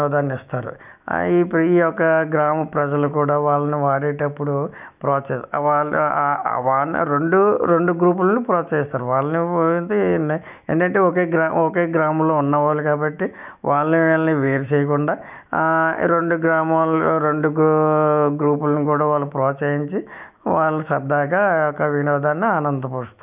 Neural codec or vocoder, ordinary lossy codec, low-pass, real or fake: autoencoder, 48 kHz, 128 numbers a frame, DAC-VAE, trained on Japanese speech; none; 3.6 kHz; fake